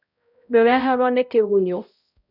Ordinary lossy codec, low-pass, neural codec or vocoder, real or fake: none; 5.4 kHz; codec, 16 kHz, 0.5 kbps, X-Codec, HuBERT features, trained on balanced general audio; fake